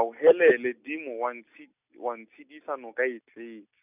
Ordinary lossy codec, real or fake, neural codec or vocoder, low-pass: none; real; none; 3.6 kHz